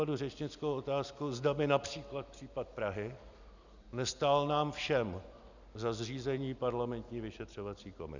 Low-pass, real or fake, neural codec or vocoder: 7.2 kHz; real; none